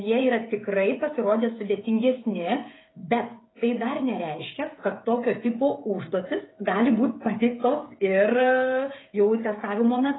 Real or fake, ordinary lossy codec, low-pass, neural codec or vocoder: fake; AAC, 16 kbps; 7.2 kHz; codec, 16 kHz, 16 kbps, FreqCodec, smaller model